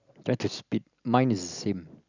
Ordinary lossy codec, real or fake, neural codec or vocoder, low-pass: none; real; none; 7.2 kHz